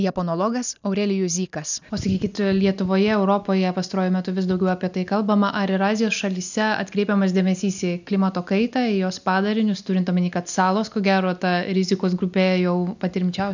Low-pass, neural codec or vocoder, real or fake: 7.2 kHz; none; real